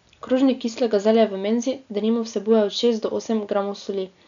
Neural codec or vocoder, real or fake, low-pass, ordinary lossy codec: none; real; 7.2 kHz; none